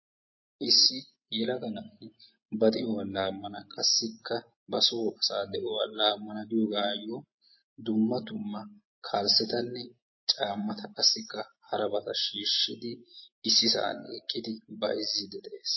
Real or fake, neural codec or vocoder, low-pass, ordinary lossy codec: real; none; 7.2 kHz; MP3, 24 kbps